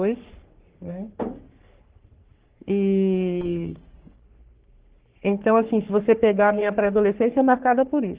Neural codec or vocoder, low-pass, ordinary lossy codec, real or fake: codec, 44.1 kHz, 3.4 kbps, Pupu-Codec; 3.6 kHz; Opus, 16 kbps; fake